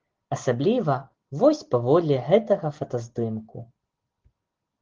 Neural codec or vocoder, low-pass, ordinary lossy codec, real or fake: none; 7.2 kHz; Opus, 16 kbps; real